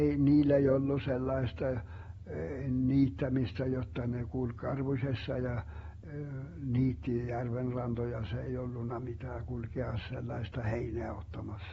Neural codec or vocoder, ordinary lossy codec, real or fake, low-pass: none; AAC, 24 kbps; real; 19.8 kHz